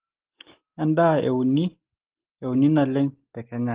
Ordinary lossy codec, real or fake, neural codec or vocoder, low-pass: Opus, 16 kbps; real; none; 3.6 kHz